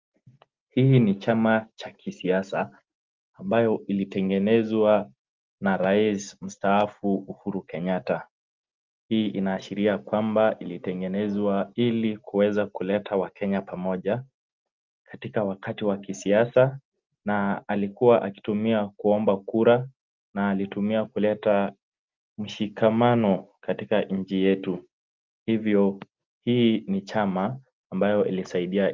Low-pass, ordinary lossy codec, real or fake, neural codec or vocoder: 7.2 kHz; Opus, 32 kbps; real; none